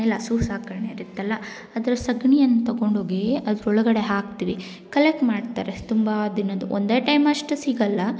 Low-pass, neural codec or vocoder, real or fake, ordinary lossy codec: none; none; real; none